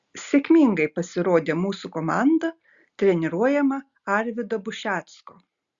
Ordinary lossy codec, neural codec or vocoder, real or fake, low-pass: Opus, 64 kbps; none; real; 7.2 kHz